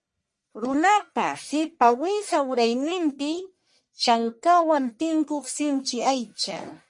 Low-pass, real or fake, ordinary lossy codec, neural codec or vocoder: 10.8 kHz; fake; MP3, 48 kbps; codec, 44.1 kHz, 1.7 kbps, Pupu-Codec